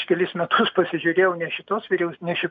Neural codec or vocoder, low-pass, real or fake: none; 7.2 kHz; real